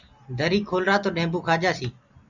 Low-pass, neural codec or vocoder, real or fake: 7.2 kHz; none; real